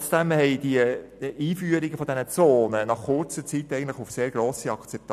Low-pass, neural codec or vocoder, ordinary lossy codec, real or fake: 14.4 kHz; none; none; real